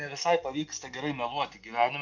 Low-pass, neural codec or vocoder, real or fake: 7.2 kHz; none; real